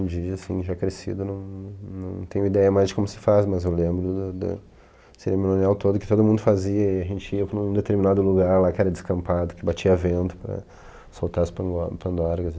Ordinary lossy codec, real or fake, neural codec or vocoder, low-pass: none; real; none; none